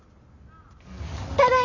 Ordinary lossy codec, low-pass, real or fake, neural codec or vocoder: none; 7.2 kHz; real; none